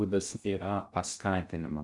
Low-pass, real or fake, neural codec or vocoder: 10.8 kHz; fake; codec, 16 kHz in and 24 kHz out, 0.6 kbps, FocalCodec, streaming, 2048 codes